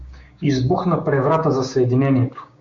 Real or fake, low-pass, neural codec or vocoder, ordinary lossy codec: fake; 7.2 kHz; codec, 16 kHz, 6 kbps, DAC; MP3, 48 kbps